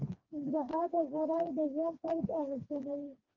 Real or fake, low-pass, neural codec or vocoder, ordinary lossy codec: fake; 7.2 kHz; codec, 16 kHz, 2 kbps, FreqCodec, smaller model; Opus, 24 kbps